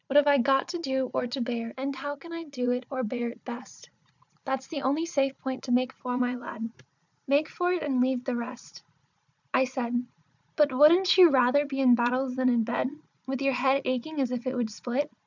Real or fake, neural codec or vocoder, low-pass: fake; vocoder, 44.1 kHz, 80 mel bands, Vocos; 7.2 kHz